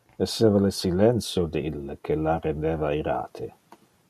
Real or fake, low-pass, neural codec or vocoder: real; 14.4 kHz; none